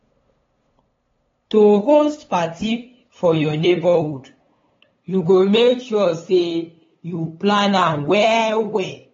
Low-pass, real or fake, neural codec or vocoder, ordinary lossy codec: 7.2 kHz; fake; codec, 16 kHz, 8 kbps, FunCodec, trained on LibriTTS, 25 frames a second; AAC, 24 kbps